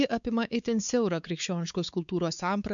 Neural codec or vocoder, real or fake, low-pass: codec, 16 kHz, 4 kbps, X-Codec, WavLM features, trained on Multilingual LibriSpeech; fake; 7.2 kHz